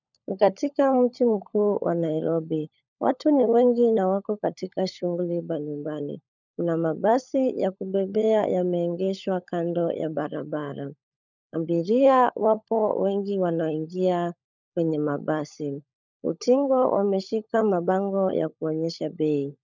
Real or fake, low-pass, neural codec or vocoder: fake; 7.2 kHz; codec, 16 kHz, 16 kbps, FunCodec, trained on LibriTTS, 50 frames a second